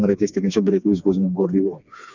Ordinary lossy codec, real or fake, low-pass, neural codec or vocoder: none; fake; 7.2 kHz; codec, 16 kHz, 2 kbps, FreqCodec, smaller model